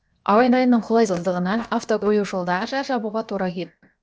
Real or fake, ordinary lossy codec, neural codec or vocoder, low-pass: fake; none; codec, 16 kHz, 0.7 kbps, FocalCodec; none